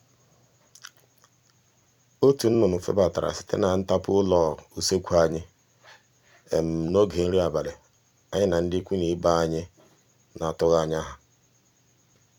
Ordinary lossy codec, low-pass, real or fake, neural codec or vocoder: none; 19.8 kHz; fake; vocoder, 44.1 kHz, 128 mel bands every 256 samples, BigVGAN v2